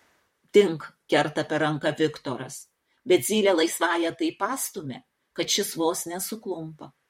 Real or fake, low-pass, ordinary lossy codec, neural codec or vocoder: fake; 19.8 kHz; MP3, 64 kbps; vocoder, 44.1 kHz, 128 mel bands, Pupu-Vocoder